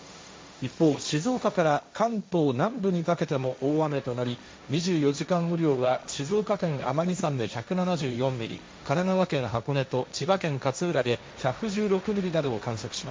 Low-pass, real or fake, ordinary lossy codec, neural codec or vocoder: none; fake; none; codec, 16 kHz, 1.1 kbps, Voila-Tokenizer